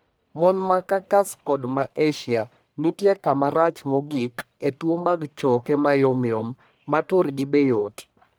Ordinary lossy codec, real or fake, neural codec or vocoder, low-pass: none; fake; codec, 44.1 kHz, 1.7 kbps, Pupu-Codec; none